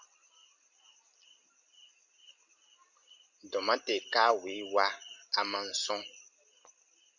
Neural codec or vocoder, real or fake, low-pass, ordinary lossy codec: none; real; 7.2 kHz; Opus, 64 kbps